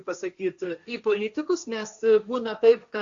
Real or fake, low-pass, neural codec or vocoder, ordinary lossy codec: fake; 7.2 kHz; codec, 16 kHz, 1.1 kbps, Voila-Tokenizer; Opus, 64 kbps